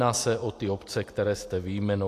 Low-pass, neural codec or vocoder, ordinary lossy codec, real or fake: 14.4 kHz; none; AAC, 64 kbps; real